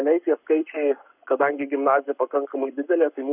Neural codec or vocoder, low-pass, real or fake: codec, 44.1 kHz, 7.8 kbps, Pupu-Codec; 3.6 kHz; fake